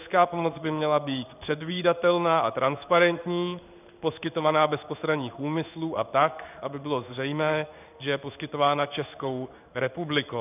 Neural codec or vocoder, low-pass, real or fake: codec, 16 kHz in and 24 kHz out, 1 kbps, XY-Tokenizer; 3.6 kHz; fake